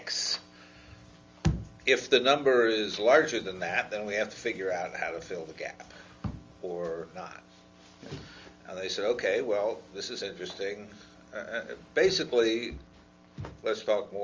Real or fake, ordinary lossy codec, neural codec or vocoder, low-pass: real; Opus, 32 kbps; none; 7.2 kHz